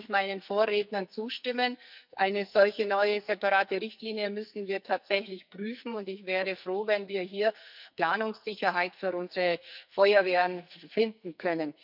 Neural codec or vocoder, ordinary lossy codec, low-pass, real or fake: codec, 44.1 kHz, 2.6 kbps, SNAC; none; 5.4 kHz; fake